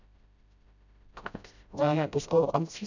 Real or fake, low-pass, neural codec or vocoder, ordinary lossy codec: fake; 7.2 kHz; codec, 16 kHz, 0.5 kbps, FreqCodec, smaller model; none